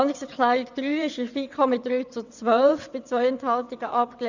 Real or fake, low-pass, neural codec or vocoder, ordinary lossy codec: fake; 7.2 kHz; vocoder, 22.05 kHz, 80 mel bands, WaveNeXt; none